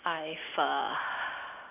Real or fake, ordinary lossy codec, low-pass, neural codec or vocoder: real; none; 3.6 kHz; none